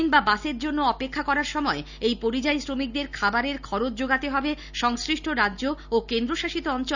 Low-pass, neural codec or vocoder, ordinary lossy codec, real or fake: 7.2 kHz; none; none; real